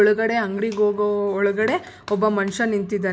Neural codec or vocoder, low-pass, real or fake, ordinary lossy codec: none; none; real; none